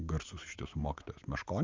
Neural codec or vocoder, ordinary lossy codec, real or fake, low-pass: none; Opus, 32 kbps; real; 7.2 kHz